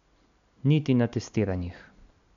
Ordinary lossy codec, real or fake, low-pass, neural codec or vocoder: none; real; 7.2 kHz; none